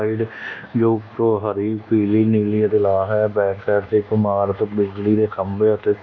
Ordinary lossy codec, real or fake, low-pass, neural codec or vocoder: none; fake; 7.2 kHz; codec, 24 kHz, 1.2 kbps, DualCodec